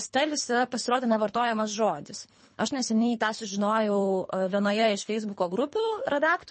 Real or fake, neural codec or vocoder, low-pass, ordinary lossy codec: fake; codec, 24 kHz, 3 kbps, HILCodec; 10.8 kHz; MP3, 32 kbps